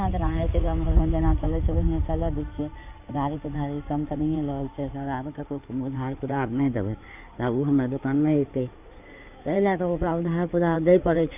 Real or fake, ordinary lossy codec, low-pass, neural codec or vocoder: fake; none; 3.6 kHz; codec, 16 kHz in and 24 kHz out, 2.2 kbps, FireRedTTS-2 codec